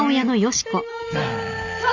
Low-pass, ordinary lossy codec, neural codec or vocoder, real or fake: 7.2 kHz; none; none; real